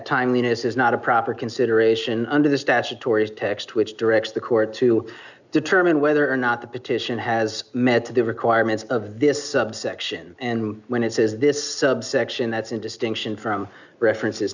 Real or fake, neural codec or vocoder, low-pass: real; none; 7.2 kHz